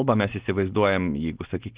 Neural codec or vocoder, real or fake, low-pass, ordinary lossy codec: none; real; 3.6 kHz; Opus, 16 kbps